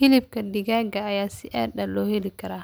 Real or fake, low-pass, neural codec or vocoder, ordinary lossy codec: real; none; none; none